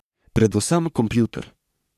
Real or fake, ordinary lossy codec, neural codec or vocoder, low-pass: fake; none; codec, 44.1 kHz, 3.4 kbps, Pupu-Codec; 14.4 kHz